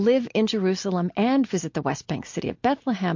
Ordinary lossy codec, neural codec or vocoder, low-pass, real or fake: MP3, 48 kbps; none; 7.2 kHz; real